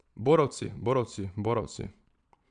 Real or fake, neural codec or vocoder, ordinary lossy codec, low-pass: fake; vocoder, 22.05 kHz, 80 mel bands, Vocos; none; 9.9 kHz